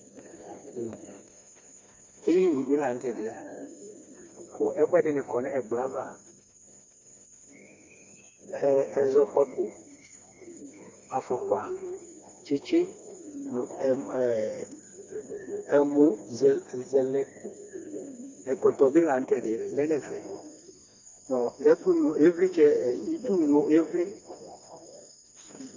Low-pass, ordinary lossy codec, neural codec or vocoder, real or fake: 7.2 kHz; MP3, 64 kbps; codec, 16 kHz, 2 kbps, FreqCodec, smaller model; fake